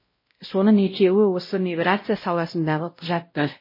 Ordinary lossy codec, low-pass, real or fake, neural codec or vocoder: MP3, 24 kbps; 5.4 kHz; fake; codec, 16 kHz, 0.5 kbps, X-Codec, WavLM features, trained on Multilingual LibriSpeech